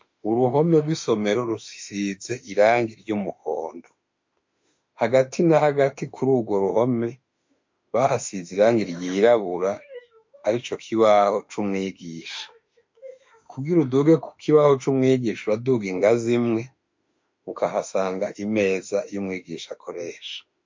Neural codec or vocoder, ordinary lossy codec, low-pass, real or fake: autoencoder, 48 kHz, 32 numbers a frame, DAC-VAE, trained on Japanese speech; MP3, 48 kbps; 7.2 kHz; fake